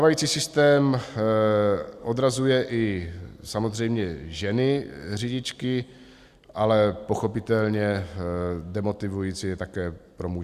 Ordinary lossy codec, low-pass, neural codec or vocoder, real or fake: Opus, 64 kbps; 14.4 kHz; none; real